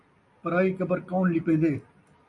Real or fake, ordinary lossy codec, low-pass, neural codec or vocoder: real; MP3, 96 kbps; 10.8 kHz; none